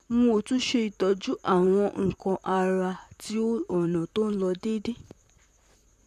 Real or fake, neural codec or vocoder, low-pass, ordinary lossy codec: fake; vocoder, 44.1 kHz, 128 mel bands, Pupu-Vocoder; 14.4 kHz; none